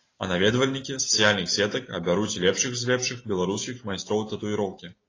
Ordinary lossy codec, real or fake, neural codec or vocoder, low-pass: AAC, 32 kbps; real; none; 7.2 kHz